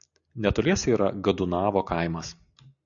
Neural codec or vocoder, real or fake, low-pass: none; real; 7.2 kHz